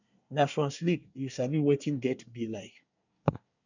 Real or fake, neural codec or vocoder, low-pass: fake; codec, 24 kHz, 1 kbps, SNAC; 7.2 kHz